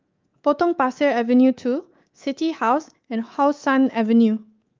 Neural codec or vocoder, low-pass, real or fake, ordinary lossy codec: codec, 24 kHz, 3.1 kbps, DualCodec; 7.2 kHz; fake; Opus, 24 kbps